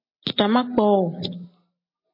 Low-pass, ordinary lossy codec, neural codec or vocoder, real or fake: 5.4 kHz; MP3, 32 kbps; none; real